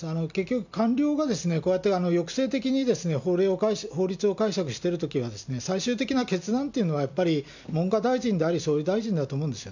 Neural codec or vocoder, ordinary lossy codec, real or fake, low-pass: none; none; real; 7.2 kHz